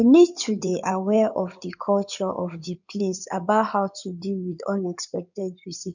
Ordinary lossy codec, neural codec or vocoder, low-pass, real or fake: none; codec, 16 kHz in and 24 kHz out, 2.2 kbps, FireRedTTS-2 codec; 7.2 kHz; fake